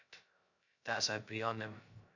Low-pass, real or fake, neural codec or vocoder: 7.2 kHz; fake; codec, 16 kHz, 0.2 kbps, FocalCodec